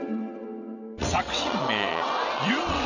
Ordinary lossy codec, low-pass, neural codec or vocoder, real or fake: none; 7.2 kHz; vocoder, 22.05 kHz, 80 mel bands, WaveNeXt; fake